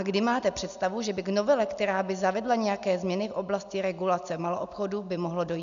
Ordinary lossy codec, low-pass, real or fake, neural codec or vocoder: MP3, 96 kbps; 7.2 kHz; real; none